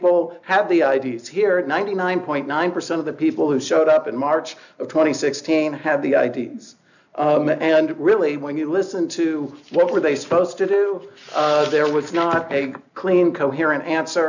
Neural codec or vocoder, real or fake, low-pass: none; real; 7.2 kHz